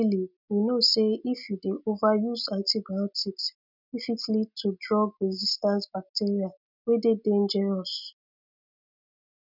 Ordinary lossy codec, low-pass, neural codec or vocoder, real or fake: none; 5.4 kHz; none; real